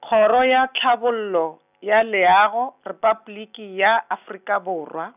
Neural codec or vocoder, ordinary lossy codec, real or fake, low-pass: none; none; real; 3.6 kHz